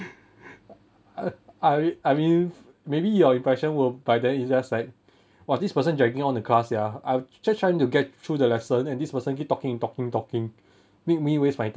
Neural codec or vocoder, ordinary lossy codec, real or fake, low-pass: none; none; real; none